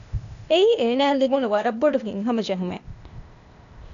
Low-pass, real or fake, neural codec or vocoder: 7.2 kHz; fake; codec, 16 kHz, 0.8 kbps, ZipCodec